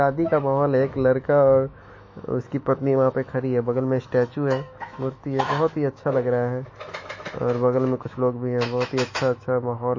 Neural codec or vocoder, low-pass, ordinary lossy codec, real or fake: autoencoder, 48 kHz, 128 numbers a frame, DAC-VAE, trained on Japanese speech; 7.2 kHz; MP3, 32 kbps; fake